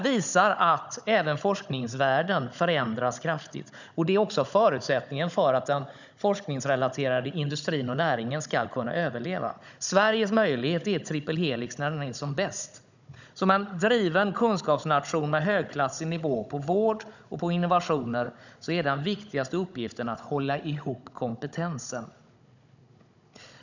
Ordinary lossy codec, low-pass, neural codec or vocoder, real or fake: none; 7.2 kHz; codec, 16 kHz, 16 kbps, FunCodec, trained on Chinese and English, 50 frames a second; fake